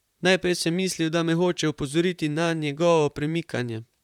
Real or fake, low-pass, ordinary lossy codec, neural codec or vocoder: fake; 19.8 kHz; none; vocoder, 44.1 kHz, 128 mel bands, Pupu-Vocoder